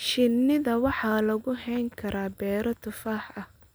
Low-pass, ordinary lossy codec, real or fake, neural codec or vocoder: none; none; real; none